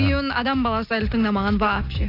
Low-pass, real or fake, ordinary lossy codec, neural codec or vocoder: 5.4 kHz; real; AAC, 32 kbps; none